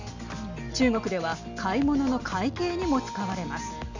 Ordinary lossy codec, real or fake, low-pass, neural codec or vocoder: Opus, 64 kbps; real; 7.2 kHz; none